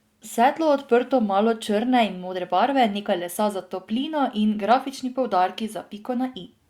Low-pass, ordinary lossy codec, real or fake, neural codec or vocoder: 19.8 kHz; Opus, 64 kbps; real; none